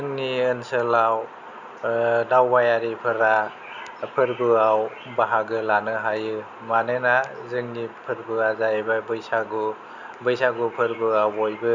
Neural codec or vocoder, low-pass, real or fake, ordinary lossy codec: none; 7.2 kHz; real; none